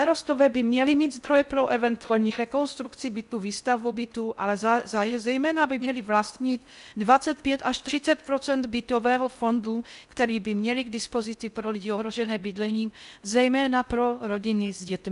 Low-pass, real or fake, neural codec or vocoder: 10.8 kHz; fake; codec, 16 kHz in and 24 kHz out, 0.6 kbps, FocalCodec, streaming, 4096 codes